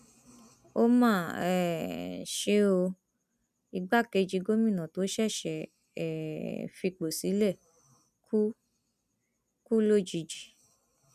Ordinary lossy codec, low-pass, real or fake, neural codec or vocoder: none; 14.4 kHz; real; none